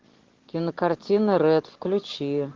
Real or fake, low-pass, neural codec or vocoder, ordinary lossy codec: real; 7.2 kHz; none; Opus, 16 kbps